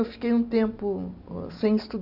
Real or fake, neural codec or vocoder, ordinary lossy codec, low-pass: real; none; none; 5.4 kHz